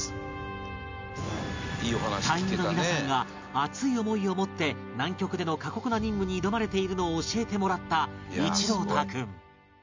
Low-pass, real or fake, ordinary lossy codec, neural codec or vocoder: 7.2 kHz; real; MP3, 64 kbps; none